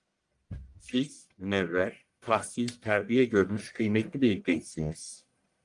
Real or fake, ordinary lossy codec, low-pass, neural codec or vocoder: fake; Opus, 32 kbps; 10.8 kHz; codec, 44.1 kHz, 1.7 kbps, Pupu-Codec